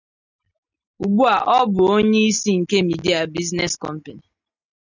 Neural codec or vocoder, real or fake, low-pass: none; real; 7.2 kHz